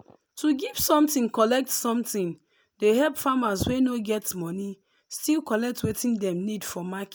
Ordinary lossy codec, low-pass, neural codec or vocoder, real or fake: none; none; none; real